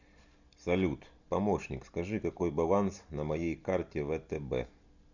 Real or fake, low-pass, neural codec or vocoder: real; 7.2 kHz; none